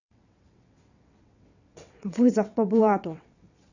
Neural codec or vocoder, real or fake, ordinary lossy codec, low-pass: vocoder, 22.05 kHz, 80 mel bands, WaveNeXt; fake; none; 7.2 kHz